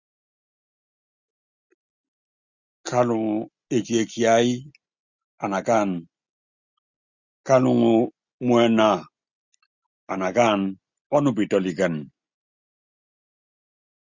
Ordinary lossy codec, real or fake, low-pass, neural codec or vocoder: Opus, 64 kbps; real; 7.2 kHz; none